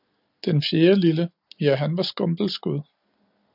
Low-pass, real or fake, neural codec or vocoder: 5.4 kHz; real; none